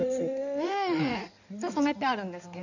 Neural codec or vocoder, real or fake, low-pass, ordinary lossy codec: codec, 16 kHz in and 24 kHz out, 2.2 kbps, FireRedTTS-2 codec; fake; 7.2 kHz; none